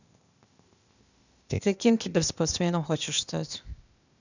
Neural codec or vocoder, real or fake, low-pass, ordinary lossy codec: codec, 16 kHz, 0.8 kbps, ZipCodec; fake; 7.2 kHz; none